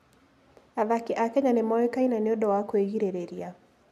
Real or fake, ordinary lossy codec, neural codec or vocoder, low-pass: real; AAC, 96 kbps; none; 14.4 kHz